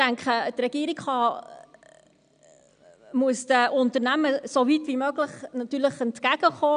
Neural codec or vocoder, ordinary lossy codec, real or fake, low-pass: none; none; real; 9.9 kHz